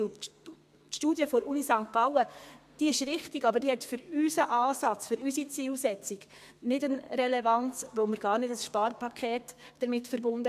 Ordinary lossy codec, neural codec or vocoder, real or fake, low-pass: MP3, 96 kbps; codec, 32 kHz, 1.9 kbps, SNAC; fake; 14.4 kHz